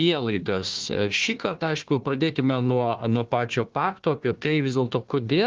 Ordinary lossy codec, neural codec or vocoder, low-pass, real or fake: Opus, 32 kbps; codec, 16 kHz, 1 kbps, FunCodec, trained on Chinese and English, 50 frames a second; 7.2 kHz; fake